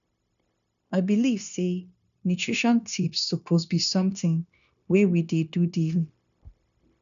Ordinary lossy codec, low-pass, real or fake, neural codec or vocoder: none; 7.2 kHz; fake; codec, 16 kHz, 0.9 kbps, LongCat-Audio-Codec